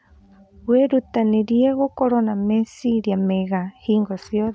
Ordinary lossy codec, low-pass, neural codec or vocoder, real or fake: none; none; none; real